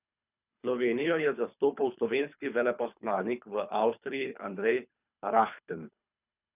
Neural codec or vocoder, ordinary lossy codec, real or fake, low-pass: codec, 24 kHz, 3 kbps, HILCodec; none; fake; 3.6 kHz